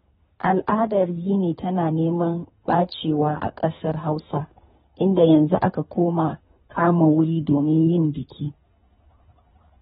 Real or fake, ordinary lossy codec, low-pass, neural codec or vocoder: fake; AAC, 16 kbps; 10.8 kHz; codec, 24 kHz, 3 kbps, HILCodec